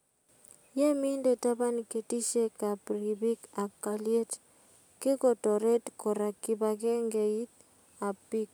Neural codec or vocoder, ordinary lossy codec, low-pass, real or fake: none; none; none; real